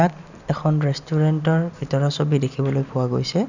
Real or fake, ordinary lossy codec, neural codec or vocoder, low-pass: real; none; none; 7.2 kHz